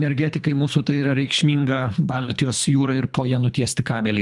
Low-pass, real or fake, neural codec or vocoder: 10.8 kHz; fake; codec, 24 kHz, 3 kbps, HILCodec